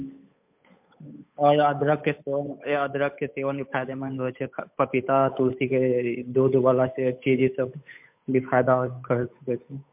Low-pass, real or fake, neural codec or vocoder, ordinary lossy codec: 3.6 kHz; fake; codec, 16 kHz, 8 kbps, FunCodec, trained on Chinese and English, 25 frames a second; MP3, 32 kbps